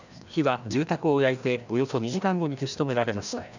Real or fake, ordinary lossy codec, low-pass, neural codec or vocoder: fake; none; 7.2 kHz; codec, 16 kHz, 1 kbps, FreqCodec, larger model